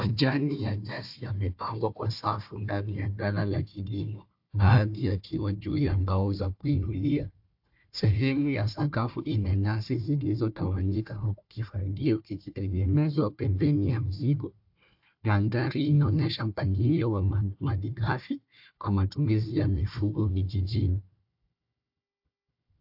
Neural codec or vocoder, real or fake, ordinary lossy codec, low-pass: codec, 16 kHz, 1 kbps, FunCodec, trained on Chinese and English, 50 frames a second; fake; AAC, 48 kbps; 5.4 kHz